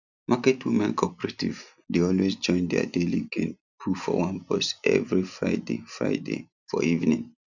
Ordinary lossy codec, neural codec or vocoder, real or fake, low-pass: none; none; real; 7.2 kHz